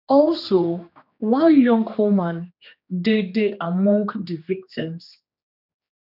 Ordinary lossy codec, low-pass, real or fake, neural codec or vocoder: none; 5.4 kHz; fake; codec, 16 kHz, 2 kbps, X-Codec, HuBERT features, trained on general audio